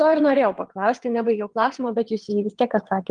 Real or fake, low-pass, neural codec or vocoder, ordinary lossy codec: fake; 9.9 kHz; vocoder, 22.05 kHz, 80 mel bands, WaveNeXt; Opus, 24 kbps